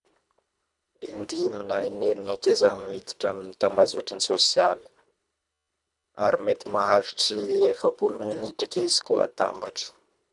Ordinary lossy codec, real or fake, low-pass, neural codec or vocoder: none; fake; 10.8 kHz; codec, 24 kHz, 1.5 kbps, HILCodec